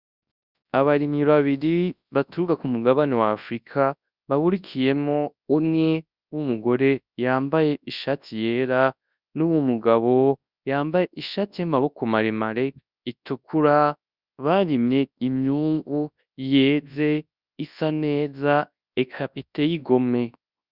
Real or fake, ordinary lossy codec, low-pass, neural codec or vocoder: fake; Opus, 64 kbps; 5.4 kHz; codec, 24 kHz, 0.9 kbps, WavTokenizer, large speech release